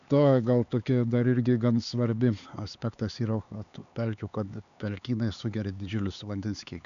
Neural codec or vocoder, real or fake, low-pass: codec, 16 kHz, 4 kbps, X-Codec, HuBERT features, trained on LibriSpeech; fake; 7.2 kHz